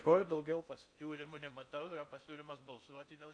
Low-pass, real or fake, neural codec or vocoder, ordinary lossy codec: 9.9 kHz; fake; codec, 16 kHz in and 24 kHz out, 0.6 kbps, FocalCodec, streaming, 4096 codes; AAC, 64 kbps